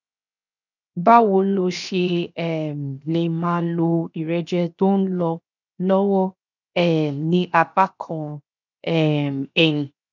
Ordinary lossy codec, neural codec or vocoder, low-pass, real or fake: none; codec, 16 kHz, 0.7 kbps, FocalCodec; 7.2 kHz; fake